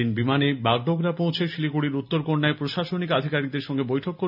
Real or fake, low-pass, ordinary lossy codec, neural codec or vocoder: real; 5.4 kHz; none; none